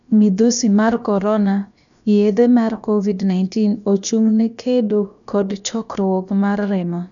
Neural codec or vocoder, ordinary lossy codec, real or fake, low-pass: codec, 16 kHz, about 1 kbps, DyCAST, with the encoder's durations; none; fake; 7.2 kHz